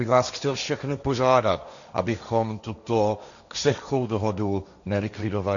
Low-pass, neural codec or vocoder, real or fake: 7.2 kHz; codec, 16 kHz, 1.1 kbps, Voila-Tokenizer; fake